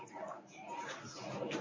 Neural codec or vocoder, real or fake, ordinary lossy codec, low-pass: codec, 24 kHz, 0.9 kbps, WavTokenizer, medium speech release version 1; fake; MP3, 32 kbps; 7.2 kHz